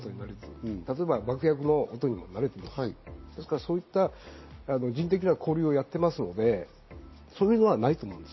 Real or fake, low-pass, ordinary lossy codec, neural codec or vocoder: real; 7.2 kHz; MP3, 24 kbps; none